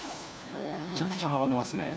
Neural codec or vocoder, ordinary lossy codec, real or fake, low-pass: codec, 16 kHz, 1 kbps, FunCodec, trained on LibriTTS, 50 frames a second; none; fake; none